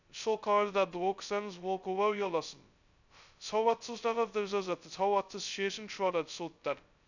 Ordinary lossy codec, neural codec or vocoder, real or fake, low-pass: none; codec, 16 kHz, 0.2 kbps, FocalCodec; fake; 7.2 kHz